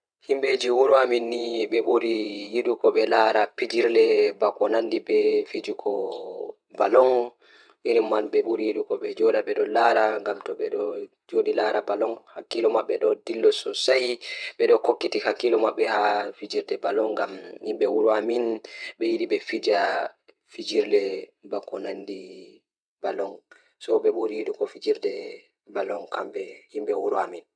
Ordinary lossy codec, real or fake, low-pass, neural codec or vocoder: none; fake; 9.9 kHz; vocoder, 22.05 kHz, 80 mel bands, WaveNeXt